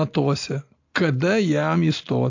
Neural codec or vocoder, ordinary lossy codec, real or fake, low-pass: none; AAC, 48 kbps; real; 7.2 kHz